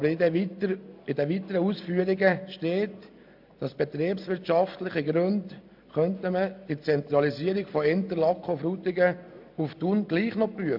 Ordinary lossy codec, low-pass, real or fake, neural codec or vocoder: AAC, 48 kbps; 5.4 kHz; real; none